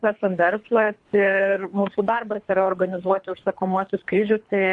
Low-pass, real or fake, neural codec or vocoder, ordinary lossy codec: 10.8 kHz; fake; codec, 24 kHz, 3 kbps, HILCodec; MP3, 64 kbps